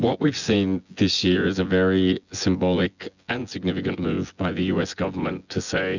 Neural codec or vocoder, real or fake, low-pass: vocoder, 24 kHz, 100 mel bands, Vocos; fake; 7.2 kHz